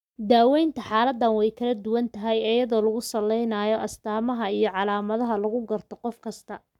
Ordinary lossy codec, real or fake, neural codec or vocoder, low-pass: none; fake; codec, 44.1 kHz, 7.8 kbps, Pupu-Codec; 19.8 kHz